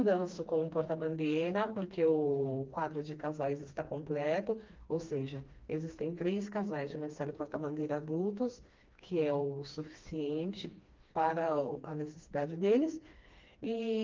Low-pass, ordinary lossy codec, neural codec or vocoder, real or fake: 7.2 kHz; Opus, 24 kbps; codec, 16 kHz, 2 kbps, FreqCodec, smaller model; fake